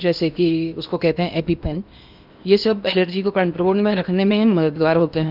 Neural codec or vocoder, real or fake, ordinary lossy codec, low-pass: codec, 16 kHz in and 24 kHz out, 0.8 kbps, FocalCodec, streaming, 65536 codes; fake; none; 5.4 kHz